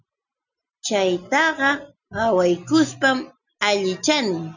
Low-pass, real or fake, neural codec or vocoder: 7.2 kHz; real; none